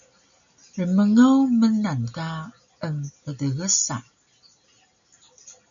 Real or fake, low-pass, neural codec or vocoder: real; 7.2 kHz; none